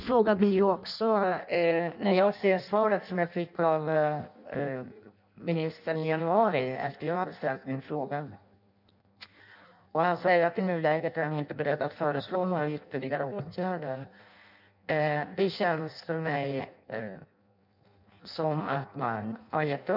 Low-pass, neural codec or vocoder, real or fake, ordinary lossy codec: 5.4 kHz; codec, 16 kHz in and 24 kHz out, 0.6 kbps, FireRedTTS-2 codec; fake; none